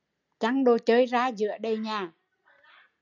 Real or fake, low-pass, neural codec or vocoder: real; 7.2 kHz; none